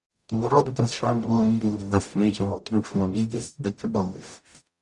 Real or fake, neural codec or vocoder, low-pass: fake; codec, 44.1 kHz, 0.9 kbps, DAC; 10.8 kHz